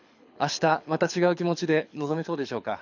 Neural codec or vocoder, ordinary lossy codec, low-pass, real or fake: codec, 24 kHz, 6 kbps, HILCodec; none; 7.2 kHz; fake